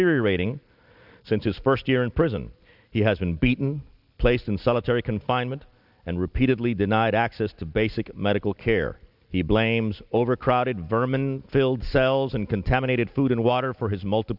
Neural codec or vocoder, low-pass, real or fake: none; 5.4 kHz; real